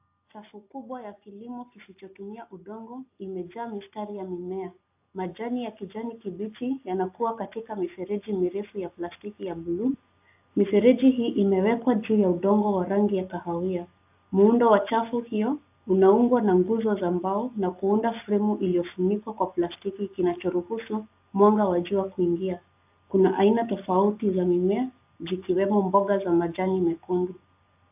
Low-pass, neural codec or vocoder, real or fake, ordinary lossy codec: 3.6 kHz; none; real; AAC, 32 kbps